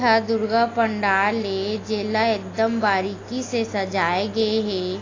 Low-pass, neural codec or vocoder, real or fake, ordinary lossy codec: 7.2 kHz; none; real; AAC, 32 kbps